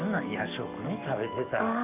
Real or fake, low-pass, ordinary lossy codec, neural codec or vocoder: fake; 3.6 kHz; none; codec, 44.1 kHz, 7.8 kbps, DAC